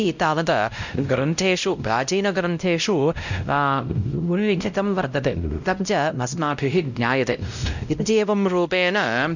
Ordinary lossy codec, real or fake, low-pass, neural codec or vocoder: none; fake; 7.2 kHz; codec, 16 kHz, 0.5 kbps, X-Codec, WavLM features, trained on Multilingual LibriSpeech